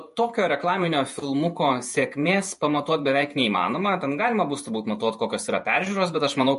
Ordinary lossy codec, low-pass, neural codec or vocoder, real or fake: MP3, 48 kbps; 14.4 kHz; vocoder, 48 kHz, 128 mel bands, Vocos; fake